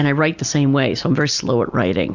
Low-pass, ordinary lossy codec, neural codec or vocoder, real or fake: 7.2 kHz; Opus, 64 kbps; none; real